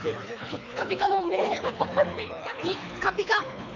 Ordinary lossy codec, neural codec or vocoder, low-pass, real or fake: none; codec, 24 kHz, 3 kbps, HILCodec; 7.2 kHz; fake